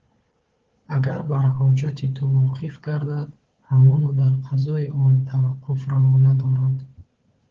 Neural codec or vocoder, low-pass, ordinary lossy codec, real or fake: codec, 16 kHz, 4 kbps, FunCodec, trained on Chinese and English, 50 frames a second; 7.2 kHz; Opus, 16 kbps; fake